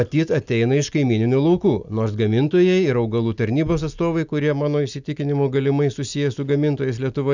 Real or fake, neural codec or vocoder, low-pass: real; none; 7.2 kHz